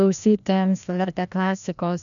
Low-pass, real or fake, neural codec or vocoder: 7.2 kHz; fake; codec, 16 kHz, 1 kbps, FreqCodec, larger model